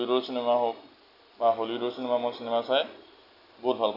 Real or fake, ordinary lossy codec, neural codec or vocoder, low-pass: real; none; none; 5.4 kHz